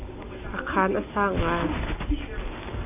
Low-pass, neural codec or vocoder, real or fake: 3.6 kHz; none; real